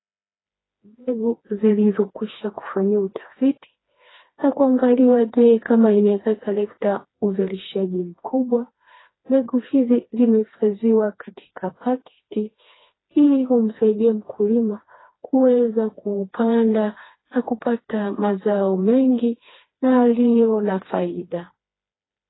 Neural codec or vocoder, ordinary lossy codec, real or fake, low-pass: codec, 16 kHz, 2 kbps, FreqCodec, smaller model; AAC, 16 kbps; fake; 7.2 kHz